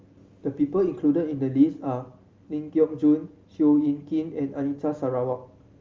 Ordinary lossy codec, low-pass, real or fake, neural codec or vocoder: Opus, 32 kbps; 7.2 kHz; real; none